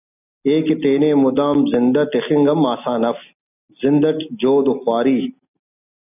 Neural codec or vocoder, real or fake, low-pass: none; real; 3.6 kHz